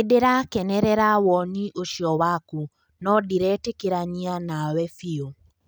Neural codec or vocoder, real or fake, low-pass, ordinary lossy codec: none; real; none; none